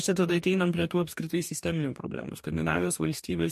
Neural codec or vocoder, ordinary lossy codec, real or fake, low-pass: codec, 44.1 kHz, 2.6 kbps, DAC; MP3, 64 kbps; fake; 14.4 kHz